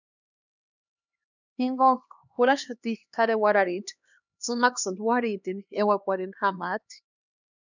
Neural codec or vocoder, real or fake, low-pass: codec, 16 kHz, 2 kbps, X-Codec, HuBERT features, trained on LibriSpeech; fake; 7.2 kHz